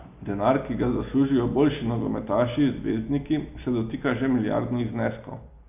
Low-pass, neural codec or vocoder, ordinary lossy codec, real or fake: 3.6 kHz; none; none; real